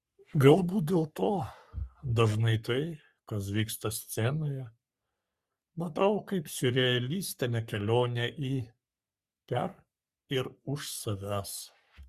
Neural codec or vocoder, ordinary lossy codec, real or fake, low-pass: codec, 44.1 kHz, 3.4 kbps, Pupu-Codec; Opus, 64 kbps; fake; 14.4 kHz